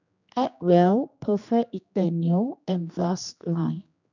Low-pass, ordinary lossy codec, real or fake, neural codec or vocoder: 7.2 kHz; none; fake; codec, 16 kHz, 2 kbps, X-Codec, HuBERT features, trained on general audio